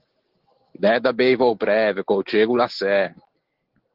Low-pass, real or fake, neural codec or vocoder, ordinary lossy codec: 5.4 kHz; real; none; Opus, 16 kbps